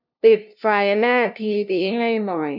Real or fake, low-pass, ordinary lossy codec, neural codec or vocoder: fake; 5.4 kHz; none; codec, 16 kHz, 0.5 kbps, FunCodec, trained on LibriTTS, 25 frames a second